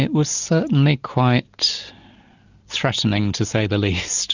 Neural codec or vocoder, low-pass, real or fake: none; 7.2 kHz; real